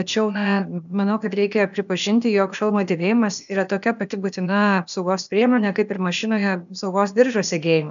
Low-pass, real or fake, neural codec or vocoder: 7.2 kHz; fake; codec, 16 kHz, 0.8 kbps, ZipCodec